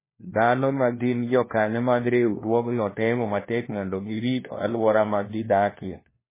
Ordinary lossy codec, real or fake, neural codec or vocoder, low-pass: MP3, 16 kbps; fake; codec, 16 kHz, 1 kbps, FunCodec, trained on LibriTTS, 50 frames a second; 3.6 kHz